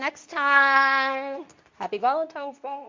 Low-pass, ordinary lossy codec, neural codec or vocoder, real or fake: 7.2 kHz; MP3, 64 kbps; codec, 24 kHz, 0.9 kbps, WavTokenizer, medium speech release version 2; fake